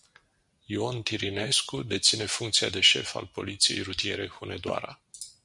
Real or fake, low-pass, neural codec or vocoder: real; 10.8 kHz; none